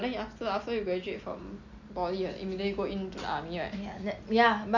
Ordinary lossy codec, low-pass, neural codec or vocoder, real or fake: none; 7.2 kHz; none; real